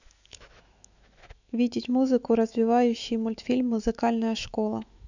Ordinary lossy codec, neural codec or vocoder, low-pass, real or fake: none; autoencoder, 48 kHz, 128 numbers a frame, DAC-VAE, trained on Japanese speech; 7.2 kHz; fake